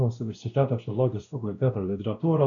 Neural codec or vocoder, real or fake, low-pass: codec, 16 kHz, 1 kbps, X-Codec, WavLM features, trained on Multilingual LibriSpeech; fake; 7.2 kHz